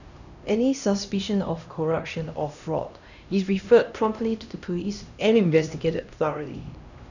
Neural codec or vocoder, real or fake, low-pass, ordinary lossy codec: codec, 16 kHz, 1 kbps, X-Codec, HuBERT features, trained on LibriSpeech; fake; 7.2 kHz; none